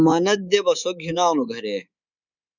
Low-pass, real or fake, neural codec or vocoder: 7.2 kHz; fake; codec, 24 kHz, 3.1 kbps, DualCodec